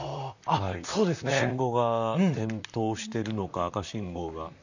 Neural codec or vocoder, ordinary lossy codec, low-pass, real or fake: vocoder, 44.1 kHz, 128 mel bands, Pupu-Vocoder; none; 7.2 kHz; fake